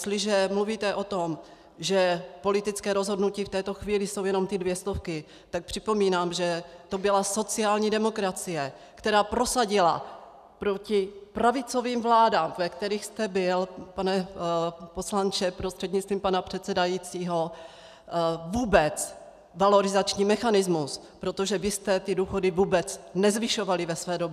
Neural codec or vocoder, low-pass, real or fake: none; 14.4 kHz; real